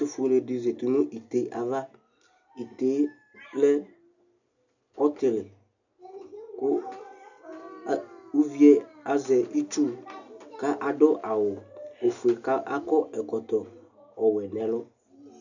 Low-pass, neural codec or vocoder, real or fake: 7.2 kHz; none; real